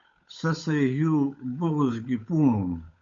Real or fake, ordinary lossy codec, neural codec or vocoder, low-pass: fake; MP3, 48 kbps; codec, 16 kHz, 8 kbps, FunCodec, trained on Chinese and English, 25 frames a second; 7.2 kHz